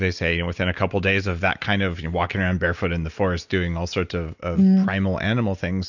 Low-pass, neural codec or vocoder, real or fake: 7.2 kHz; none; real